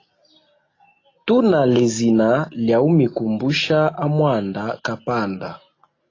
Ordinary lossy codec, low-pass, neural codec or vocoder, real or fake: AAC, 32 kbps; 7.2 kHz; none; real